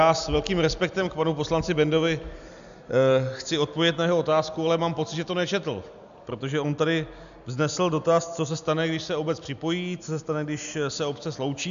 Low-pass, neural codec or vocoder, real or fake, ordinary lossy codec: 7.2 kHz; none; real; MP3, 96 kbps